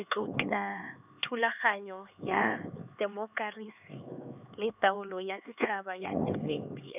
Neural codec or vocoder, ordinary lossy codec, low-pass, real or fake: codec, 16 kHz, 4 kbps, X-Codec, HuBERT features, trained on LibriSpeech; none; 3.6 kHz; fake